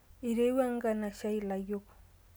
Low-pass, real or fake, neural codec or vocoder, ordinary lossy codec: none; real; none; none